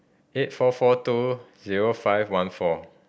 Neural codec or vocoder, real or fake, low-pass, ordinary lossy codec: none; real; none; none